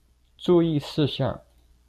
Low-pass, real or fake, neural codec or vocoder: 14.4 kHz; real; none